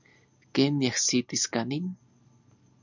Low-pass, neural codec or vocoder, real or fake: 7.2 kHz; none; real